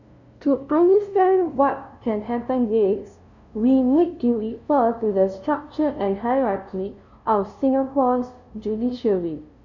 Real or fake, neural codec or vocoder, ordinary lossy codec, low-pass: fake; codec, 16 kHz, 0.5 kbps, FunCodec, trained on LibriTTS, 25 frames a second; none; 7.2 kHz